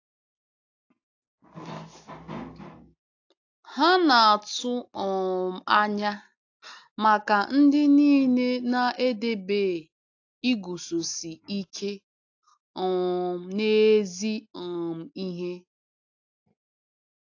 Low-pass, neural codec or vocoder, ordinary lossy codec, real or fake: 7.2 kHz; none; AAC, 48 kbps; real